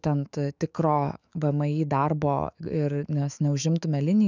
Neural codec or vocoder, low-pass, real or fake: autoencoder, 48 kHz, 128 numbers a frame, DAC-VAE, trained on Japanese speech; 7.2 kHz; fake